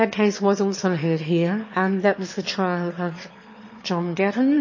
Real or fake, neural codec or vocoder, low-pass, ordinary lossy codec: fake; autoencoder, 22.05 kHz, a latent of 192 numbers a frame, VITS, trained on one speaker; 7.2 kHz; MP3, 32 kbps